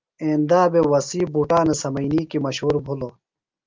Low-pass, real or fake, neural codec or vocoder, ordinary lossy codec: 7.2 kHz; real; none; Opus, 24 kbps